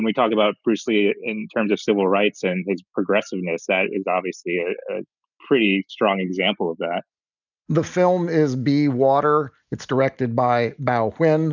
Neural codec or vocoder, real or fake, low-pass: none; real; 7.2 kHz